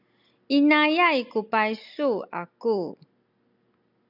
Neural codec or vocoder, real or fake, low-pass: none; real; 5.4 kHz